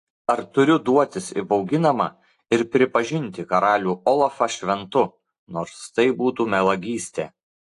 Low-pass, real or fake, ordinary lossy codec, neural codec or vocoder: 10.8 kHz; real; AAC, 48 kbps; none